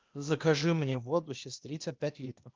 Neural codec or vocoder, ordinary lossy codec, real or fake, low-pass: codec, 16 kHz, 0.8 kbps, ZipCodec; Opus, 24 kbps; fake; 7.2 kHz